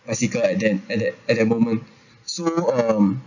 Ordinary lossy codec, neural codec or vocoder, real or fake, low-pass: none; none; real; 7.2 kHz